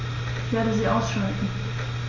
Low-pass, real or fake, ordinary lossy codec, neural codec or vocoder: 7.2 kHz; real; MP3, 32 kbps; none